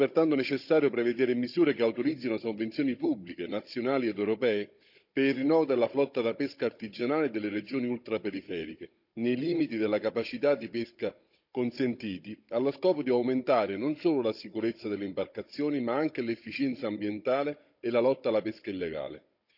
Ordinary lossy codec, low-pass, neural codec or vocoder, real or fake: none; 5.4 kHz; codec, 16 kHz, 16 kbps, FunCodec, trained on Chinese and English, 50 frames a second; fake